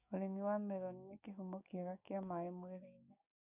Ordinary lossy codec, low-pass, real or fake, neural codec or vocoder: none; 3.6 kHz; fake; codec, 16 kHz, 6 kbps, DAC